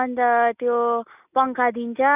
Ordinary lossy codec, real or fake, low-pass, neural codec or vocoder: none; real; 3.6 kHz; none